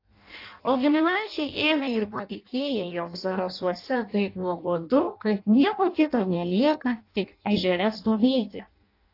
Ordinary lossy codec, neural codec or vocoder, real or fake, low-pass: AAC, 32 kbps; codec, 16 kHz in and 24 kHz out, 0.6 kbps, FireRedTTS-2 codec; fake; 5.4 kHz